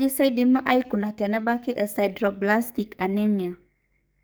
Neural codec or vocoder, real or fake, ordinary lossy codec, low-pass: codec, 44.1 kHz, 2.6 kbps, SNAC; fake; none; none